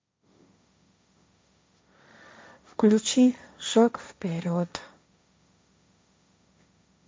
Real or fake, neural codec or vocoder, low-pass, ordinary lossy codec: fake; codec, 16 kHz, 1.1 kbps, Voila-Tokenizer; none; none